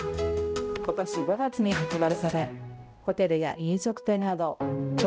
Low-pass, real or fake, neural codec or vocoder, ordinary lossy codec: none; fake; codec, 16 kHz, 0.5 kbps, X-Codec, HuBERT features, trained on balanced general audio; none